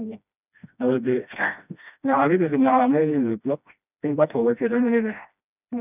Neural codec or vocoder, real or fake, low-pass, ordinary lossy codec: codec, 16 kHz, 1 kbps, FreqCodec, smaller model; fake; 3.6 kHz; none